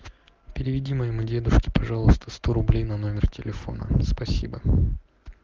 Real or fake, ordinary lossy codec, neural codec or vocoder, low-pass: real; Opus, 16 kbps; none; 7.2 kHz